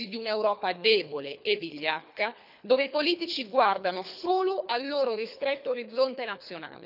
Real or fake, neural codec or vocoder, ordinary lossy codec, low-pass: fake; codec, 24 kHz, 3 kbps, HILCodec; none; 5.4 kHz